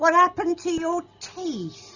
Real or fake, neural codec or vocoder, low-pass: real; none; 7.2 kHz